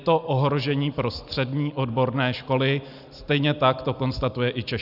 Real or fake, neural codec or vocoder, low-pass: real; none; 5.4 kHz